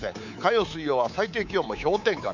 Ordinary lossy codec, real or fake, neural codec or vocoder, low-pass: none; fake; codec, 24 kHz, 3.1 kbps, DualCodec; 7.2 kHz